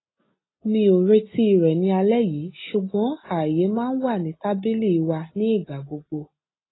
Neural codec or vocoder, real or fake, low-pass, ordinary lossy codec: none; real; 7.2 kHz; AAC, 16 kbps